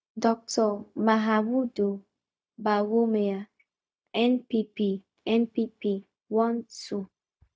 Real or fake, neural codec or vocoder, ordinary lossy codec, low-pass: fake; codec, 16 kHz, 0.4 kbps, LongCat-Audio-Codec; none; none